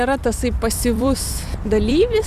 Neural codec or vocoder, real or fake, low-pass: vocoder, 44.1 kHz, 128 mel bands every 256 samples, BigVGAN v2; fake; 14.4 kHz